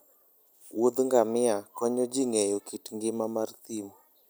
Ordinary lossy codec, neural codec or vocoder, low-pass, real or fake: none; none; none; real